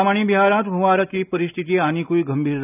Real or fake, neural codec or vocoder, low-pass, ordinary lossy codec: real; none; 3.6 kHz; none